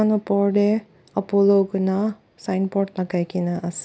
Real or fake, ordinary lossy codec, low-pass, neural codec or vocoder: real; none; none; none